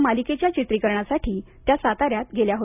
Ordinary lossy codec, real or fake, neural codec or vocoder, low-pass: none; real; none; 3.6 kHz